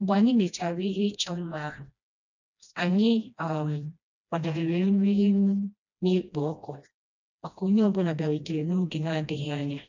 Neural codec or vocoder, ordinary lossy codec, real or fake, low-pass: codec, 16 kHz, 1 kbps, FreqCodec, smaller model; none; fake; 7.2 kHz